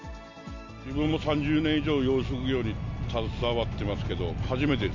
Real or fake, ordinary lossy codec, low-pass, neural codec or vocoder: real; MP3, 48 kbps; 7.2 kHz; none